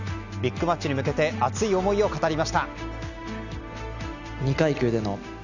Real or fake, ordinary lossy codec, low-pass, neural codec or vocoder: real; Opus, 64 kbps; 7.2 kHz; none